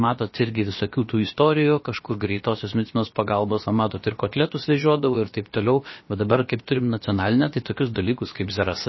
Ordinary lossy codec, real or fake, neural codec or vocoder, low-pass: MP3, 24 kbps; fake; codec, 16 kHz, about 1 kbps, DyCAST, with the encoder's durations; 7.2 kHz